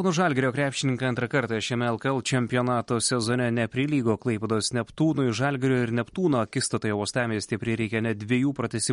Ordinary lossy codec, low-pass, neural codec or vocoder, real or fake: MP3, 48 kbps; 10.8 kHz; none; real